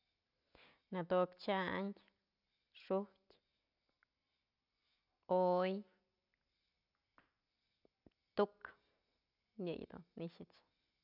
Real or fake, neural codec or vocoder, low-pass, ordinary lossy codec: real; none; 5.4 kHz; none